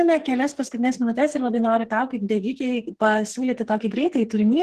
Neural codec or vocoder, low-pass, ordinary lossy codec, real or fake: codec, 44.1 kHz, 2.6 kbps, SNAC; 14.4 kHz; Opus, 16 kbps; fake